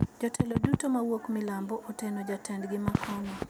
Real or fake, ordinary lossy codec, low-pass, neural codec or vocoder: real; none; none; none